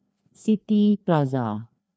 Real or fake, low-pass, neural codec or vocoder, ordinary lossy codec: fake; none; codec, 16 kHz, 2 kbps, FreqCodec, larger model; none